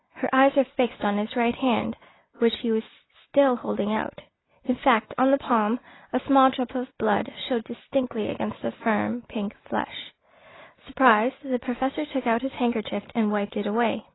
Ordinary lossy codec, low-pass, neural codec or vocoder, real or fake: AAC, 16 kbps; 7.2 kHz; none; real